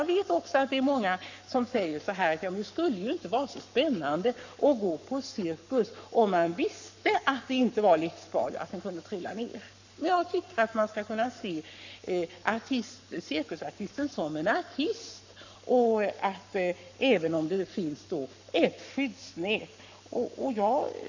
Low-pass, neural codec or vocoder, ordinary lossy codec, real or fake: 7.2 kHz; codec, 44.1 kHz, 7.8 kbps, Pupu-Codec; none; fake